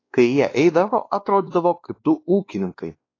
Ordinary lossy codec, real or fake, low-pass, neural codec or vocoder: AAC, 32 kbps; fake; 7.2 kHz; codec, 16 kHz, 2 kbps, X-Codec, WavLM features, trained on Multilingual LibriSpeech